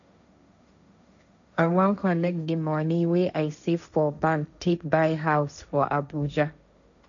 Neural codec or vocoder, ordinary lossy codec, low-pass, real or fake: codec, 16 kHz, 1.1 kbps, Voila-Tokenizer; none; 7.2 kHz; fake